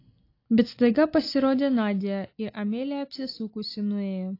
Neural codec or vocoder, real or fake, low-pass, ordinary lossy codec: none; real; 5.4 kHz; AAC, 32 kbps